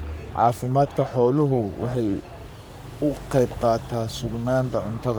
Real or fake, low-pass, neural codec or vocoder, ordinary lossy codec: fake; none; codec, 44.1 kHz, 3.4 kbps, Pupu-Codec; none